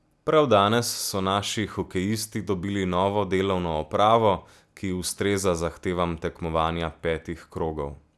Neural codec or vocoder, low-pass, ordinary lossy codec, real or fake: none; none; none; real